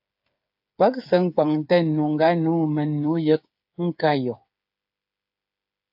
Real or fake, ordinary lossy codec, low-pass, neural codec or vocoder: fake; AAC, 48 kbps; 5.4 kHz; codec, 16 kHz, 8 kbps, FreqCodec, smaller model